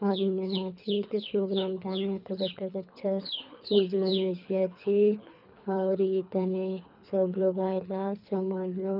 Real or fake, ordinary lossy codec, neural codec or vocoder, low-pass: fake; none; codec, 24 kHz, 3 kbps, HILCodec; 5.4 kHz